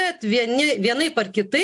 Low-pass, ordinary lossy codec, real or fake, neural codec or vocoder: 10.8 kHz; AAC, 64 kbps; real; none